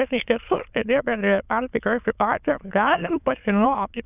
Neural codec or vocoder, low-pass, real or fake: autoencoder, 22.05 kHz, a latent of 192 numbers a frame, VITS, trained on many speakers; 3.6 kHz; fake